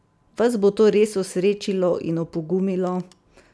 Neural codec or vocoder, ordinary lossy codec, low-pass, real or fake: none; none; none; real